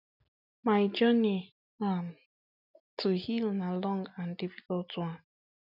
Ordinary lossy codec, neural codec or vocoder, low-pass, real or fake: none; none; 5.4 kHz; real